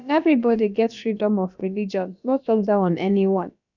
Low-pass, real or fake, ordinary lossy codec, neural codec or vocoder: 7.2 kHz; fake; none; codec, 16 kHz, about 1 kbps, DyCAST, with the encoder's durations